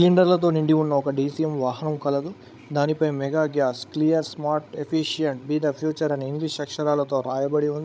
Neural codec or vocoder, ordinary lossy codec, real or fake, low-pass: codec, 16 kHz, 16 kbps, FreqCodec, larger model; none; fake; none